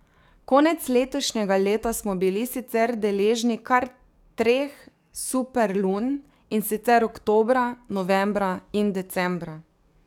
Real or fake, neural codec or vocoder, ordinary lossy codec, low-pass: fake; codec, 44.1 kHz, 7.8 kbps, DAC; none; 19.8 kHz